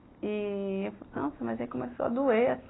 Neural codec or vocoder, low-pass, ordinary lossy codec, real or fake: none; 7.2 kHz; AAC, 16 kbps; real